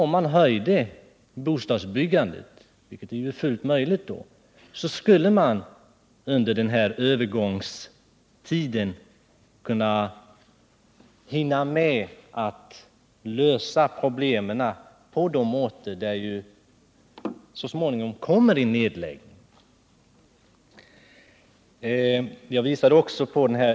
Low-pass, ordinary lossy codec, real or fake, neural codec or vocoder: none; none; real; none